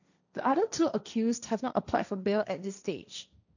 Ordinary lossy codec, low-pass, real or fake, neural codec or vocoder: none; 7.2 kHz; fake; codec, 16 kHz, 1.1 kbps, Voila-Tokenizer